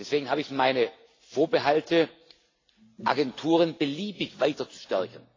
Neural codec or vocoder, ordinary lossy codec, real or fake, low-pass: none; AAC, 32 kbps; real; 7.2 kHz